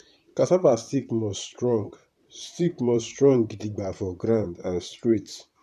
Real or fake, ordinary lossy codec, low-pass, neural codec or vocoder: fake; none; 14.4 kHz; vocoder, 44.1 kHz, 128 mel bands, Pupu-Vocoder